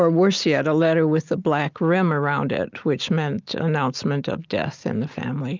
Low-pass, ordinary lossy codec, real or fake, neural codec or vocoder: 7.2 kHz; Opus, 24 kbps; real; none